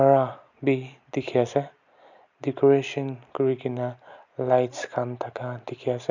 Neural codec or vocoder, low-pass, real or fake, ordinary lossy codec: none; 7.2 kHz; real; none